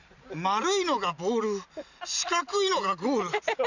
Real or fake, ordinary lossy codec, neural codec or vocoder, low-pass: real; none; none; 7.2 kHz